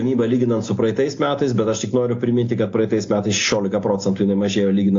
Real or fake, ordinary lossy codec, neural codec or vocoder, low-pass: real; AAC, 48 kbps; none; 7.2 kHz